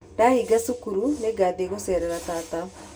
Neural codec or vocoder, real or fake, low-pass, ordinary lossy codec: none; real; none; none